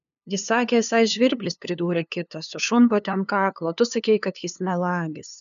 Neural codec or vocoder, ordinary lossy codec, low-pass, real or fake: codec, 16 kHz, 2 kbps, FunCodec, trained on LibriTTS, 25 frames a second; MP3, 96 kbps; 7.2 kHz; fake